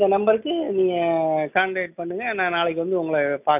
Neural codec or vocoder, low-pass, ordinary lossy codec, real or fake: none; 3.6 kHz; none; real